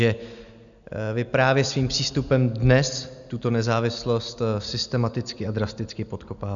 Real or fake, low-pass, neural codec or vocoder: real; 7.2 kHz; none